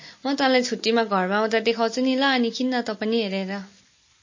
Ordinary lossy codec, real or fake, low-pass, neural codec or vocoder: MP3, 32 kbps; real; 7.2 kHz; none